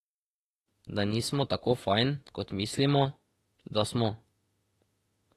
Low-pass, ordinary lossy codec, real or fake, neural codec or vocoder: 19.8 kHz; AAC, 32 kbps; fake; autoencoder, 48 kHz, 32 numbers a frame, DAC-VAE, trained on Japanese speech